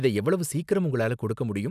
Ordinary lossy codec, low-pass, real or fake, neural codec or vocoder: none; 14.4 kHz; real; none